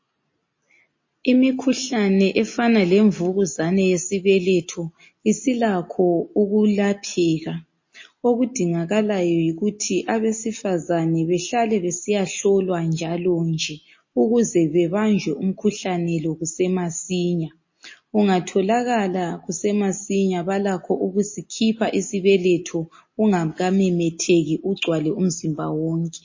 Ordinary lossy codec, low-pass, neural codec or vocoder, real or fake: MP3, 32 kbps; 7.2 kHz; none; real